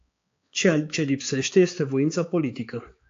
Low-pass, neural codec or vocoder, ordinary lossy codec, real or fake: 7.2 kHz; codec, 16 kHz, 4 kbps, X-Codec, HuBERT features, trained on balanced general audio; AAC, 48 kbps; fake